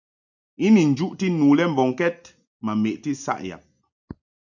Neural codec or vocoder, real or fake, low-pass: none; real; 7.2 kHz